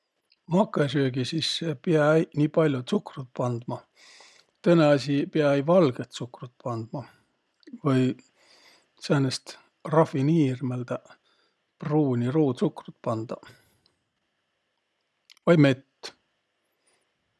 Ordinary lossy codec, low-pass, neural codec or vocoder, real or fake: none; none; none; real